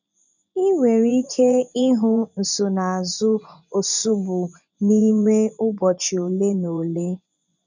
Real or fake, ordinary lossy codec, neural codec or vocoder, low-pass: fake; none; vocoder, 24 kHz, 100 mel bands, Vocos; 7.2 kHz